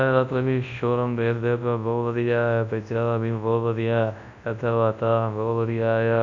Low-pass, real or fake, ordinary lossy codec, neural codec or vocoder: 7.2 kHz; fake; none; codec, 24 kHz, 0.9 kbps, WavTokenizer, large speech release